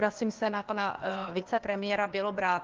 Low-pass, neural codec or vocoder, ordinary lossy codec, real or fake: 7.2 kHz; codec, 16 kHz, 0.8 kbps, ZipCodec; Opus, 32 kbps; fake